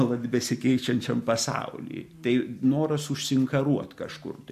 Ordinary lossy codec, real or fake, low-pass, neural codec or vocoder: MP3, 64 kbps; real; 14.4 kHz; none